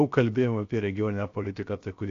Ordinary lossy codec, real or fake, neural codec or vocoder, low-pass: AAC, 48 kbps; fake; codec, 16 kHz, 0.7 kbps, FocalCodec; 7.2 kHz